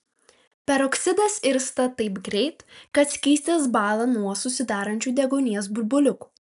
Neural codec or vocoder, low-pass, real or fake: none; 10.8 kHz; real